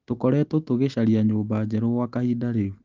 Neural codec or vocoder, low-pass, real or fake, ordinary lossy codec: none; 7.2 kHz; real; Opus, 24 kbps